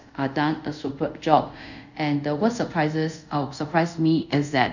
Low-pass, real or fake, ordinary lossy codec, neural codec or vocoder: 7.2 kHz; fake; none; codec, 24 kHz, 0.5 kbps, DualCodec